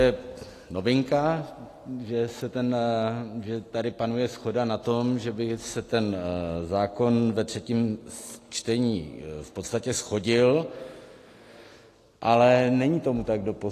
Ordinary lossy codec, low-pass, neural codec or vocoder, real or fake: AAC, 48 kbps; 14.4 kHz; none; real